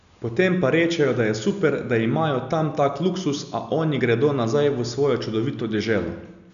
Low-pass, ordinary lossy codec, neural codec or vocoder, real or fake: 7.2 kHz; none; none; real